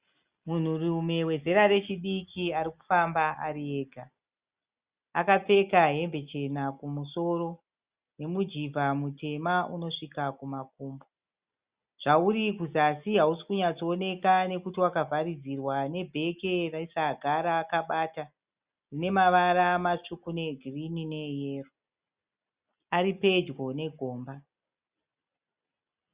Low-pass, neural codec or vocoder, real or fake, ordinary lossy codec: 3.6 kHz; none; real; Opus, 64 kbps